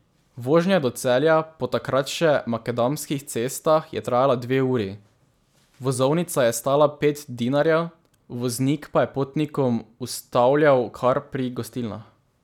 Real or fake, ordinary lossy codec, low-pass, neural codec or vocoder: real; none; 19.8 kHz; none